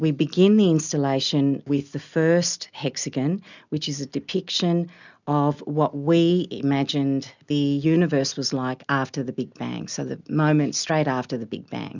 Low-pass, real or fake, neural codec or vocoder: 7.2 kHz; real; none